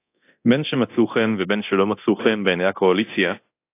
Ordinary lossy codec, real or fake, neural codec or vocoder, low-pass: AAC, 24 kbps; fake; codec, 24 kHz, 0.9 kbps, DualCodec; 3.6 kHz